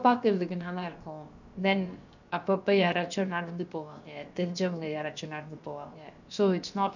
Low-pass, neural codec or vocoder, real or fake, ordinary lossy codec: 7.2 kHz; codec, 16 kHz, about 1 kbps, DyCAST, with the encoder's durations; fake; none